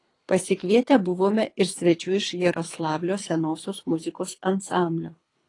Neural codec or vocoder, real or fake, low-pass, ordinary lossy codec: codec, 24 kHz, 3 kbps, HILCodec; fake; 10.8 kHz; AAC, 32 kbps